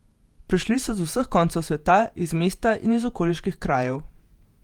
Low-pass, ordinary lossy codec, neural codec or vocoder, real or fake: 19.8 kHz; Opus, 32 kbps; vocoder, 48 kHz, 128 mel bands, Vocos; fake